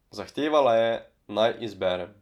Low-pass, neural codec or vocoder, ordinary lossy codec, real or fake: 19.8 kHz; none; none; real